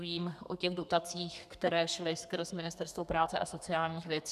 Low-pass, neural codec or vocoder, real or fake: 14.4 kHz; codec, 32 kHz, 1.9 kbps, SNAC; fake